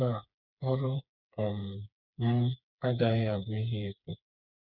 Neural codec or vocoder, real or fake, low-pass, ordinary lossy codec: codec, 16 kHz, 4 kbps, FreqCodec, smaller model; fake; 5.4 kHz; none